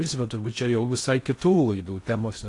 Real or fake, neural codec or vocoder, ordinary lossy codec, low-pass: fake; codec, 16 kHz in and 24 kHz out, 0.6 kbps, FocalCodec, streaming, 4096 codes; AAC, 48 kbps; 10.8 kHz